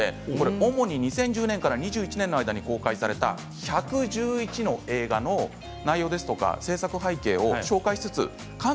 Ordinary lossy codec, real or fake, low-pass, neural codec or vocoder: none; real; none; none